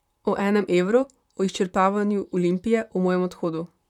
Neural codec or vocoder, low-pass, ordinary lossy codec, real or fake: vocoder, 44.1 kHz, 128 mel bands, Pupu-Vocoder; 19.8 kHz; none; fake